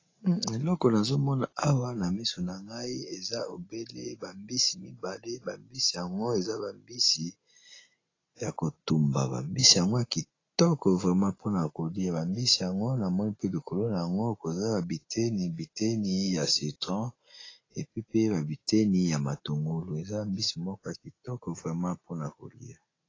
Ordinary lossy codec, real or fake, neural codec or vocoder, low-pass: AAC, 32 kbps; real; none; 7.2 kHz